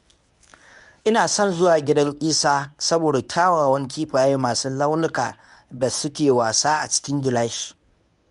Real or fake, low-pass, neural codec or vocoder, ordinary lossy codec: fake; 10.8 kHz; codec, 24 kHz, 0.9 kbps, WavTokenizer, medium speech release version 1; none